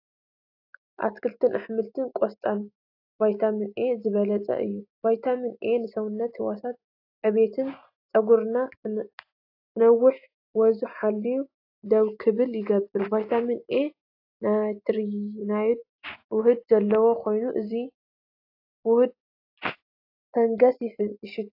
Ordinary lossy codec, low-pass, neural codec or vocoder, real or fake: AAC, 32 kbps; 5.4 kHz; none; real